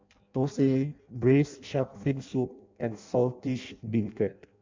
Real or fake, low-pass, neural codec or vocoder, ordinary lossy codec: fake; 7.2 kHz; codec, 16 kHz in and 24 kHz out, 0.6 kbps, FireRedTTS-2 codec; MP3, 64 kbps